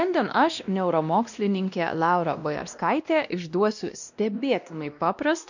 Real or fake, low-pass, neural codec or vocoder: fake; 7.2 kHz; codec, 16 kHz, 1 kbps, X-Codec, WavLM features, trained on Multilingual LibriSpeech